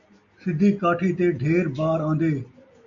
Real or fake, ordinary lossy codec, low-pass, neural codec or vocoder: real; AAC, 64 kbps; 7.2 kHz; none